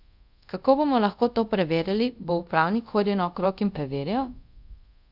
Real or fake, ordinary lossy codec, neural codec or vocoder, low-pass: fake; none; codec, 24 kHz, 0.5 kbps, DualCodec; 5.4 kHz